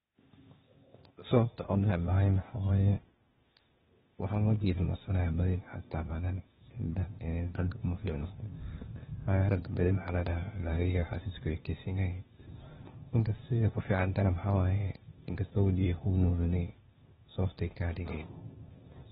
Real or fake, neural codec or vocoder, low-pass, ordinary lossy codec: fake; codec, 16 kHz, 0.8 kbps, ZipCodec; 7.2 kHz; AAC, 16 kbps